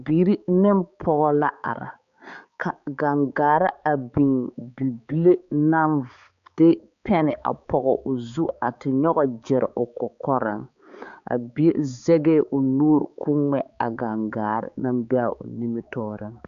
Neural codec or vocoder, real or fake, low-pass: codec, 16 kHz, 4 kbps, X-Codec, HuBERT features, trained on balanced general audio; fake; 7.2 kHz